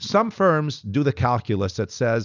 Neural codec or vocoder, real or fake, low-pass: none; real; 7.2 kHz